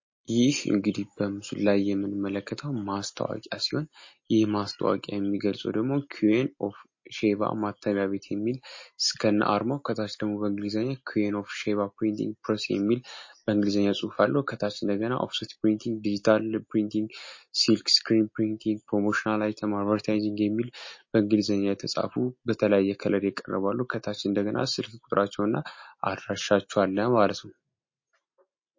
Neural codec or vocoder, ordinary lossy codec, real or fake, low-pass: none; MP3, 32 kbps; real; 7.2 kHz